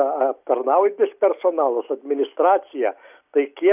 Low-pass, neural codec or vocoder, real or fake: 3.6 kHz; none; real